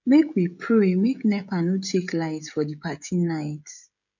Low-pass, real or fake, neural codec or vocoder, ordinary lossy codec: 7.2 kHz; fake; codec, 16 kHz, 16 kbps, FreqCodec, smaller model; none